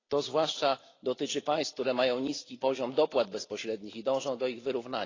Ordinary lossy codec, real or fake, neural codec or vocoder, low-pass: AAC, 32 kbps; real; none; 7.2 kHz